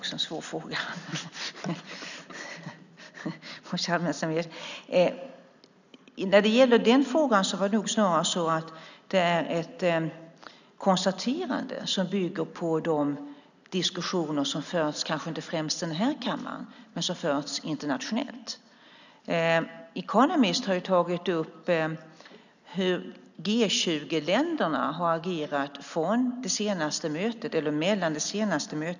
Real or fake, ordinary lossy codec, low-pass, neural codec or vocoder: real; none; 7.2 kHz; none